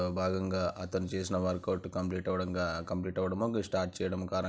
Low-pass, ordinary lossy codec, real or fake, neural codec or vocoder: none; none; real; none